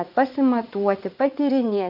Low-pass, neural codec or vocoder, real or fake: 5.4 kHz; vocoder, 44.1 kHz, 80 mel bands, Vocos; fake